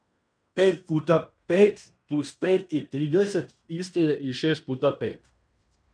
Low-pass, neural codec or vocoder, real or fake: 9.9 kHz; codec, 16 kHz in and 24 kHz out, 0.9 kbps, LongCat-Audio-Codec, fine tuned four codebook decoder; fake